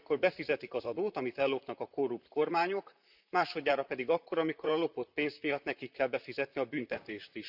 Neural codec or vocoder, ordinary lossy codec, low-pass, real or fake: vocoder, 44.1 kHz, 128 mel bands, Pupu-Vocoder; none; 5.4 kHz; fake